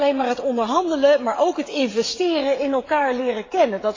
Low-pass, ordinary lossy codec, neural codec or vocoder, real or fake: 7.2 kHz; AAC, 32 kbps; codec, 16 kHz, 16 kbps, FreqCodec, smaller model; fake